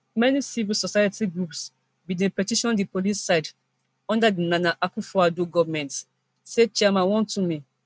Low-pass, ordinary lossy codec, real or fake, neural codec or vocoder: none; none; real; none